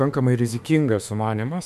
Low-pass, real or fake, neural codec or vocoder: 14.4 kHz; fake; autoencoder, 48 kHz, 32 numbers a frame, DAC-VAE, trained on Japanese speech